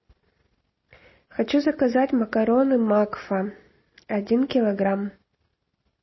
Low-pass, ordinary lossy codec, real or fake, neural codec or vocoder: 7.2 kHz; MP3, 24 kbps; real; none